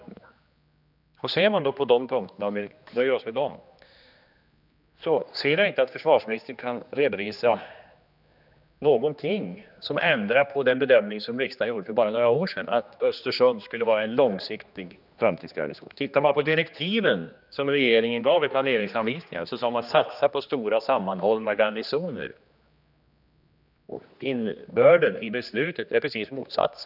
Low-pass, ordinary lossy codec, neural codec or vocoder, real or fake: 5.4 kHz; none; codec, 16 kHz, 2 kbps, X-Codec, HuBERT features, trained on general audio; fake